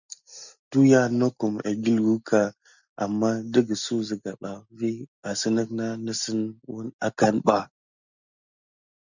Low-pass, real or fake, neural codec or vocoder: 7.2 kHz; real; none